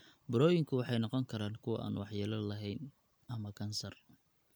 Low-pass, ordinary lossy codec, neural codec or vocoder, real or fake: none; none; none; real